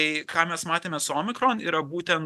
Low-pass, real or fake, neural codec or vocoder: 14.4 kHz; real; none